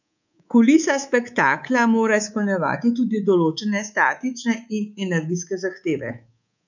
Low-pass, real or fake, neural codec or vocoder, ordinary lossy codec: 7.2 kHz; fake; codec, 24 kHz, 3.1 kbps, DualCodec; none